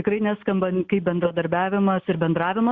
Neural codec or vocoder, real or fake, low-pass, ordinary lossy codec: none; real; 7.2 kHz; Opus, 64 kbps